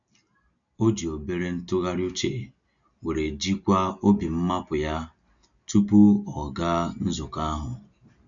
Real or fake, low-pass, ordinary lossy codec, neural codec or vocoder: real; 7.2 kHz; none; none